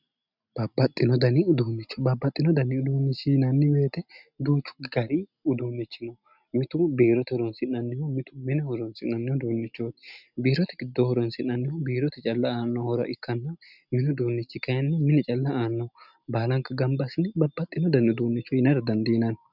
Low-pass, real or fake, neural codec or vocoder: 5.4 kHz; real; none